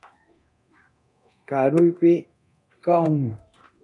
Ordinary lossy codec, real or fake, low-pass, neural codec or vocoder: MP3, 64 kbps; fake; 10.8 kHz; codec, 24 kHz, 0.9 kbps, DualCodec